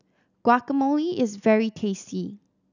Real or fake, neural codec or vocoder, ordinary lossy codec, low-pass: real; none; none; 7.2 kHz